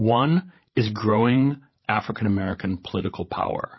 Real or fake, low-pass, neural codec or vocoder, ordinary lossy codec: fake; 7.2 kHz; codec, 16 kHz, 16 kbps, FreqCodec, larger model; MP3, 24 kbps